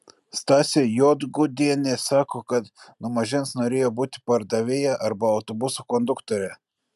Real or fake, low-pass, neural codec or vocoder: real; 14.4 kHz; none